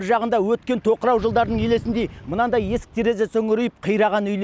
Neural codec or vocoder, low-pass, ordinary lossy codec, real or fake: none; none; none; real